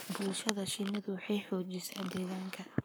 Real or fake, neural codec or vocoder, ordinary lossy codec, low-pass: fake; codec, 44.1 kHz, 7.8 kbps, Pupu-Codec; none; none